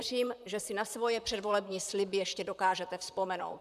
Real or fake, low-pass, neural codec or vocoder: fake; 14.4 kHz; vocoder, 44.1 kHz, 128 mel bands, Pupu-Vocoder